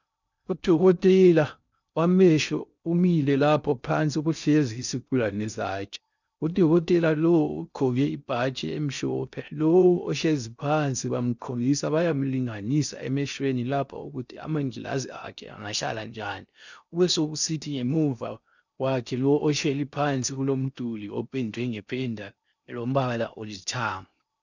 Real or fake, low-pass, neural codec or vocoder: fake; 7.2 kHz; codec, 16 kHz in and 24 kHz out, 0.6 kbps, FocalCodec, streaming, 2048 codes